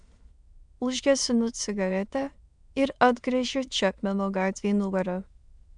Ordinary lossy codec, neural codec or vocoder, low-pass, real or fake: MP3, 96 kbps; autoencoder, 22.05 kHz, a latent of 192 numbers a frame, VITS, trained on many speakers; 9.9 kHz; fake